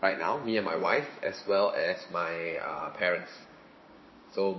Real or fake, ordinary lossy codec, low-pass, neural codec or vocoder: fake; MP3, 24 kbps; 7.2 kHz; codec, 16 kHz, 6 kbps, DAC